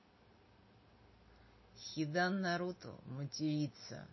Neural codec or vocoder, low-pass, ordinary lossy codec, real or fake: none; 7.2 kHz; MP3, 24 kbps; real